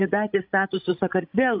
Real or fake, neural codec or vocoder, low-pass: fake; codec, 16 kHz, 16 kbps, FreqCodec, larger model; 5.4 kHz